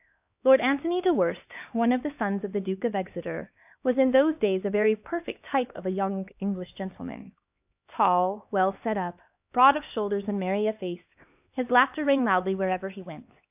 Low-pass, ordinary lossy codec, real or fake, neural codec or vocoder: 3.6 kHz; AAC, 32 kbps; fake; codec, 16 kHz, 2 kbps, X-Codec, HuBERT features, trained on LibriSpeech